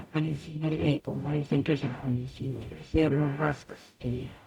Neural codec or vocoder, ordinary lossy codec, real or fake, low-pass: codec, 44.1 kHz, 0.9 kbps, DAC; none; fake; 19.8 kHz